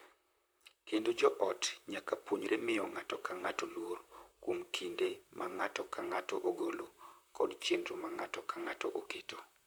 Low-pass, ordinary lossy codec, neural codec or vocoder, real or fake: none; none; vocoder, 44.1 kHz, 128 mel bands, Pupu-Vocoder; fake